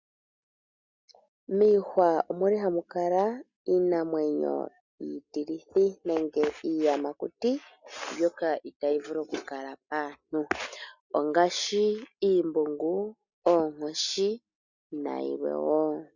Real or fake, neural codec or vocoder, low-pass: real; none; 7.2 kHz